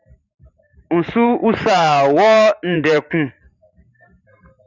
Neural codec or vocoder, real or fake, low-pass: vocoder, 44.1 kHz, 128 mel bands every 512 samples, BigVGAN v2; fake; 7.2 kHz